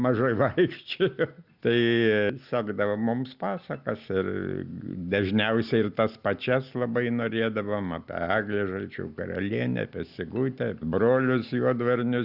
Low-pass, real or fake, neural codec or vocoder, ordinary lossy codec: 5.4 kHz; real; none; Opus, 64 kbps